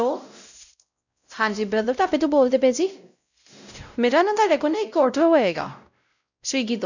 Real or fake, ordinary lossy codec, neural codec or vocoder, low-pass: fake; none; codec, 16 kHz, 0.5 kbps, X-Codec, WavLM features, trained on Multilingual LibriSpeech; 7.2 kHz